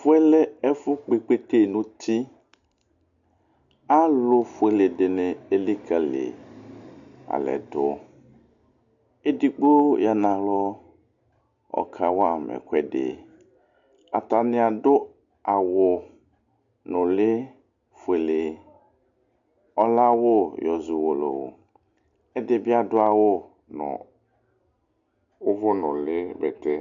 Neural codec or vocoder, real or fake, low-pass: none; real; 7.2 kHz